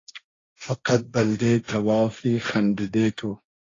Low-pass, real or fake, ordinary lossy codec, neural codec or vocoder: 7.2 kHz; fake; AAC, 32 kbps; codec, 16 kHz, 1.1 kbps, Voila-Tokenizer